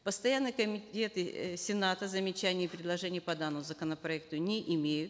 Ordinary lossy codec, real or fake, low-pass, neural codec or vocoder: none; real; none; none